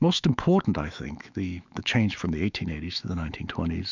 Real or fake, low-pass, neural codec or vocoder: fake; 7.2 kHz; autoencoder, 48 kHz, 128 numbers a frame, DAC-VAE, trained on Japanese speech